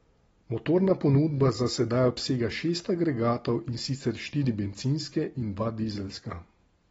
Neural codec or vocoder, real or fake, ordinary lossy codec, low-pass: none; real; AAC, 24 kbps; 19.8 kHz